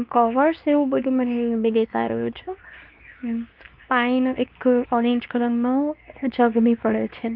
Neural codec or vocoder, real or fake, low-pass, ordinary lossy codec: codec, 24 kHz, 0.9 kbps, WavTokenizer, medium speech release version 2; fake; 5.4 kHz; Opus, 24 kbps